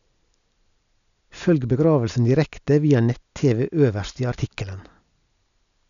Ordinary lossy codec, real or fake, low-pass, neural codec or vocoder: none; real; 7.2 kHz; none